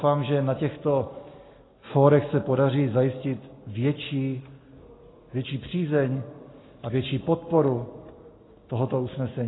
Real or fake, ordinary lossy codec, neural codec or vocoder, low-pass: real; AAC, 16 kbps; none; 7.2 kHz